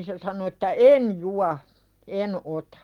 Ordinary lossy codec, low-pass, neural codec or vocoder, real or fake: Opus, 24 kbps; 19.8 kHz; none; real